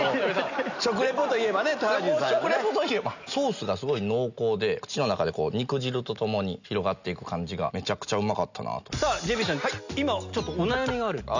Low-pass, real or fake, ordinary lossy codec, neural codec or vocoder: 7.2 kHz; real; none; none